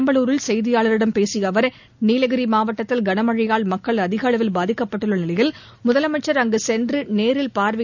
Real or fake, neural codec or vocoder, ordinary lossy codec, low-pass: real; none; none; 7.2 kHz